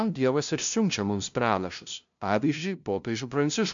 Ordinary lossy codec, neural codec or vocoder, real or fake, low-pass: MP3, 64 kbps; codec, 16 kHz, 0.5 kbps, FunCodec, trained on LibriTTS, 25 frames a second; fake; 7.2 kHz